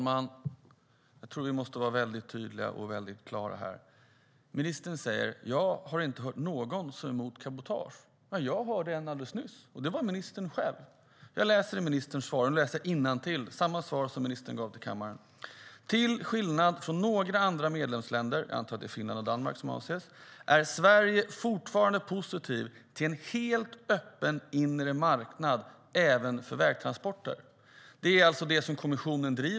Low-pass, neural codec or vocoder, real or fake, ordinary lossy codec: none; none; real; none